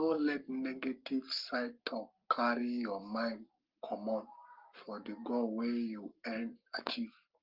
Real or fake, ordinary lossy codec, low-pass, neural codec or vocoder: fake; Opus, 24 kbps; 5.4 kHz; codec, 44.1 kHz, 7.8 kbps, Pupu-Codec